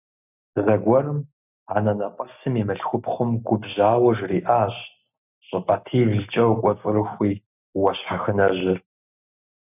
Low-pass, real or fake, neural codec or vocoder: 3.6 kHz; real; none